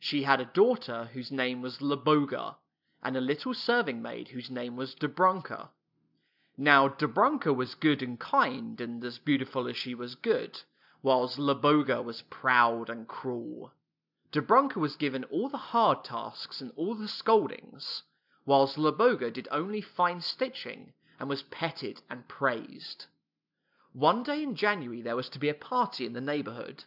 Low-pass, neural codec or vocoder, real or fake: 5.4 kHz; none; real